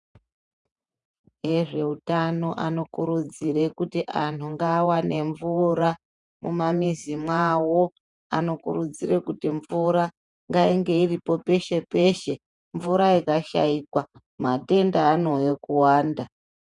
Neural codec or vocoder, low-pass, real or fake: vocoder, 48 kHz, 128 mel bands, Vocos; 10.8 kHz; fake